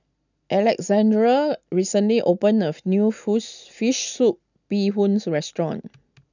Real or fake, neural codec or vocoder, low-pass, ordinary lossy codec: real; none; 7.2 kHz; none